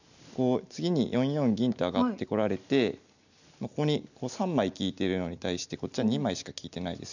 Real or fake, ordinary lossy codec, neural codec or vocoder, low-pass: real; none; none; 7.2 kHz